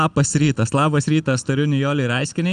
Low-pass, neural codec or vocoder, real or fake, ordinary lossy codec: 9.9 kHz; none; real; Opus, 32 kbps